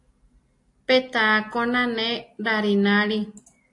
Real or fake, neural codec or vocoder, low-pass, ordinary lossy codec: real; none; 10.8 kHz; AAC, 48 kbps